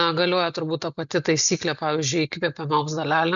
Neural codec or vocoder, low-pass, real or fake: none; 7.2 kHz; real